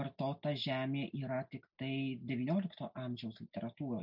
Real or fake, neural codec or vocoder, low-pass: real; none; 5.4 kHz